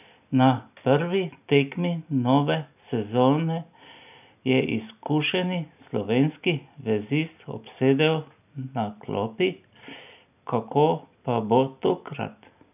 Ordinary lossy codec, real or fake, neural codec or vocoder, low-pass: none; real; none; 3.6 kHz